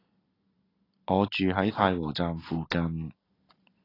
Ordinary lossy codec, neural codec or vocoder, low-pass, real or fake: AAC, 24 kbps; none; 5.4 kHz; real